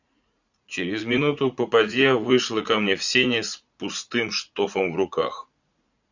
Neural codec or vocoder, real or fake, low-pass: vocoder, 44.1 kHz, 80 mel bands, Vocos; fake; 7.2 kHz